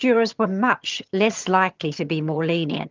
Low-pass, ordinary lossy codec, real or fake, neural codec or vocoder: 7.2 kHz; Opus, 24 kbps; fake; vocoder, 22.05 kHz, 80 mel bands, HiFi-GAN